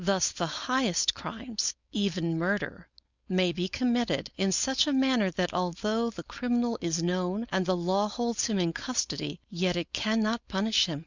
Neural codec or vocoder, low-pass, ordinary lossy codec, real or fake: codec, 16 kHz, 4.8 kbps, FACodec; 7.2 kHz; Opus, 64 kbps; fake